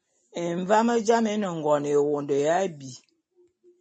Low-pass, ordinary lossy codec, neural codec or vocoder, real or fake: 10.8 kHz; MP3, 32 kbps; none; real